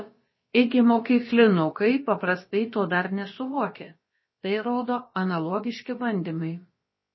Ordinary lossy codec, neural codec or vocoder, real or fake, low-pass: MP3, 24 kbps; codec, 16 kHz, about 1 kbps, DyCAST, with the encoder's durations; fake; 7.2 kHz